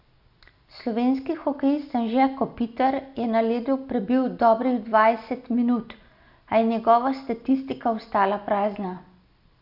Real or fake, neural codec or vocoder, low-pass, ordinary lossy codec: real; none; 5.4 kHz; AAC, 48 kbps